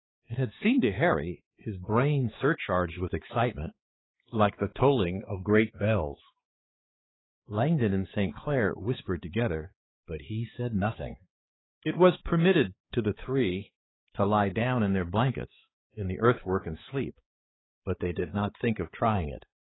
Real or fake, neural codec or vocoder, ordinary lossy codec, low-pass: fake; codec, 16 kHz, 4 kbps, X-Codec, HuBERT features, trained on balanced general audio; AAC, 16 kbps; 7.2 kHz